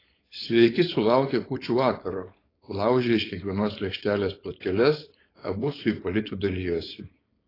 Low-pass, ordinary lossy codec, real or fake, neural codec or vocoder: 5.4 kHz; AAC, 24 kbps; fake; codec, 16 kHz, 4.8 kbps, FACodec